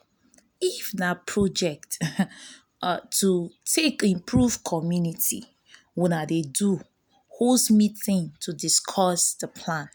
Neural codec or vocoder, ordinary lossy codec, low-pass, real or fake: none; none; none; real